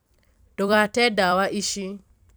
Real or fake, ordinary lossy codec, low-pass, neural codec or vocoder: fake; none; none; vocoder, 44.1 kHz, 128 mel bands every 256 samples, BigVGAN v2